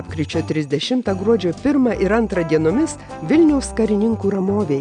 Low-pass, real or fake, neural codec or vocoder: 9.9 kHz; real; none